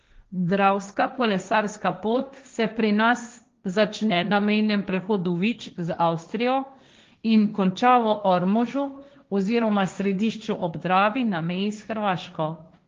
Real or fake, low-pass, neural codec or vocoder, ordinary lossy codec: fake; 7.2 kHz; codec, 16 kHz, 1.1 kbps, Voila-Tokenizer; Opus, 32 kbps